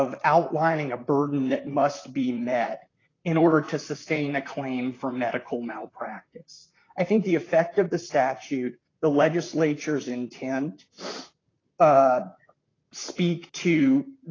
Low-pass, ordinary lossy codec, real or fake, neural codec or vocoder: 7.2 kHz; AAC, 32 kbps; fake; vocoder, 44.1 kHz, 128 mel bands, Pupu-Vocoder